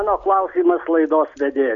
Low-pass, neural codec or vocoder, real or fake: 7.2 kHz; none; real